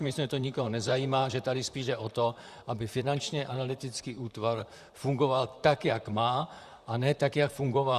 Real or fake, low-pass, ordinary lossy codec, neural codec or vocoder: fake; 14.4 kHz; Opus, 64 kbps; vocoder, 44.1 kHz, 128 mel bands, Pupu-Vocoder